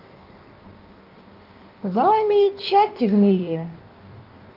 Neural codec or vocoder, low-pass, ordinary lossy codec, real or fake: codec, 16 kHz in and 24 kHz out, 1.1 kbps, FireRedTTS-2 codec; 5.4 kHz; Opus, 32 kbps; fake